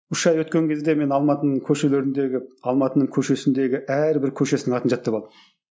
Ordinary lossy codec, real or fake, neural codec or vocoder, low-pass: none; real; none; none